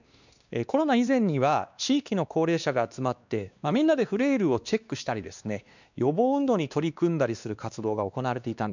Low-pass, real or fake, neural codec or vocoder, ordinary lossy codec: 7.2 kHz; fake; codec, 16 kHz, 2 kbps, X-Codec, WavLM features, trained on Multilingual LibriSpeech; none